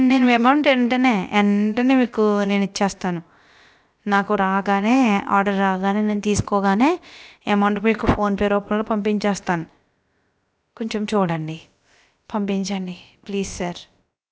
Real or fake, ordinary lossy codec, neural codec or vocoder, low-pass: fake; none; codec, 16 kHz, about 1 kbps, DyCAST, with the encoder's durations; none